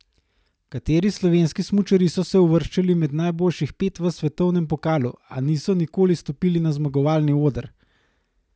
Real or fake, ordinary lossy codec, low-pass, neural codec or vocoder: real; none; none; none